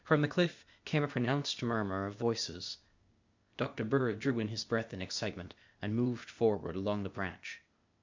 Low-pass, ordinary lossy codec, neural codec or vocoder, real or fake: 7.2 kHz; MP3, 64 kbps; codec, 16 kHz, 0.8 kbps, ZipCodec; fake